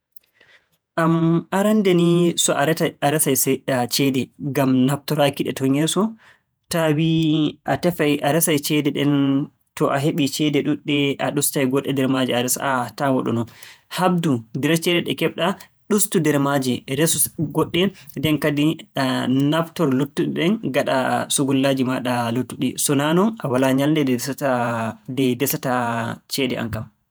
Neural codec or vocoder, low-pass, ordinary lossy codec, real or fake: vocoder, 48 kHz, 128 mel bands, Vocos; none; none; fake